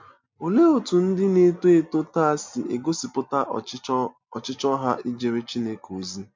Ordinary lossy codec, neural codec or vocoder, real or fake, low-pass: MP3, 64 kbps; none; real; 7.2 kHz